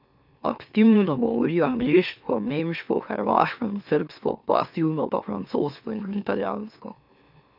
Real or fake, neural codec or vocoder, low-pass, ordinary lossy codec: fake; autoencoder, 44.1 kHz, a latent of 192 numbers a frame, MeloTTS; 5.4 kHz; none